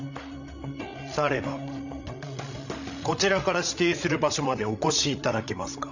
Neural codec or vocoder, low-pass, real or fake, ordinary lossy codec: codec, 16 kHz, 16 kbps, FreqCodec, larger model; 7.2 kHz; fake; none